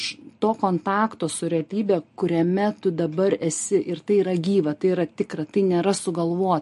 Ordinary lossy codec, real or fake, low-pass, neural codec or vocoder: MP3, 48 kbps; real; 14.4 kHz; none